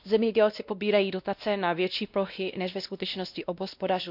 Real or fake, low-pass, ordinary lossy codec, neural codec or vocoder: fake; 5.4 kHz; none; codec, 16 kHz, 1 kbps, X-Codec, WavLM features, trained on Multilingual LibriSpeech